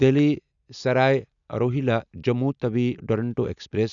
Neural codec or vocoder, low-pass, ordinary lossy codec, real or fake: none; 7.2 kHz; none; real